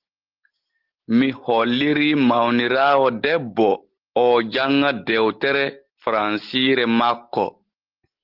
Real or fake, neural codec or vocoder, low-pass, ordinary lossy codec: real; none; 5.4 kHz; Opus, 16 kbps